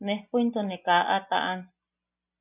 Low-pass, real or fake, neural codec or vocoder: 3.6 kHz; real; none